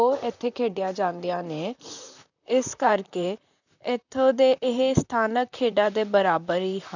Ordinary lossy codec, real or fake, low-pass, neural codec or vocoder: none; fake; 7.2 kHz; vocoder, 44.1 kHz, 128 mel bands, Pupu-Vocoder